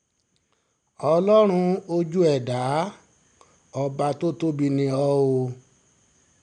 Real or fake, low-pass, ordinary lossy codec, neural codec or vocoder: real; 9.9 kHz; none; none